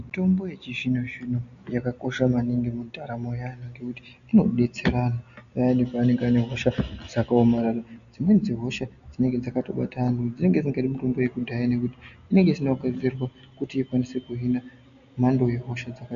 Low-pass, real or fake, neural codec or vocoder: 7.2 kHz; real; none